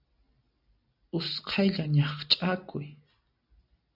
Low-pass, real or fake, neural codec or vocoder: 5.4 kHz; real; none